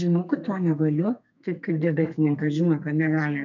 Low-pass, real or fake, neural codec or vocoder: 7.2 kHz; fake; codec, 32 kHz, 1.9 kbps, SNAC